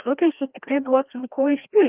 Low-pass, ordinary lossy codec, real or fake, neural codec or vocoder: 3.6 kHz; Opus, 24 kbps; fake; codec, 16 kHz, 1 kbps, FreqCodec, larger model